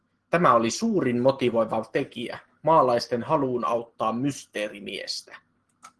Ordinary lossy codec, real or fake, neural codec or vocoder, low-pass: Opus, 16 kbps; real; none; 10.8 kHz